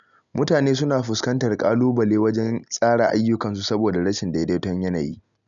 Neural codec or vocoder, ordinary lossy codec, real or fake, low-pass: none; none; real; 7.2 kHz